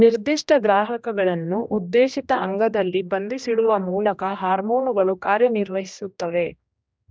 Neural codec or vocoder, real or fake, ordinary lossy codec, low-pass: codec, 16 kHz, 1 kbps, X-Codec, HuBERT features, trained on general audio; fake; none; none